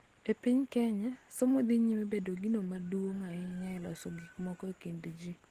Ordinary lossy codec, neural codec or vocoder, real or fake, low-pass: Opus, 16 kbps; none; real; 14.4 kHz